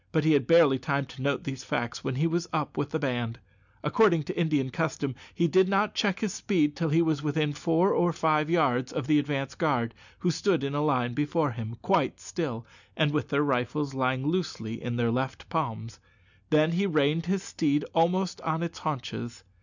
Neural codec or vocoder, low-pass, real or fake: none; 7.2 kHz; real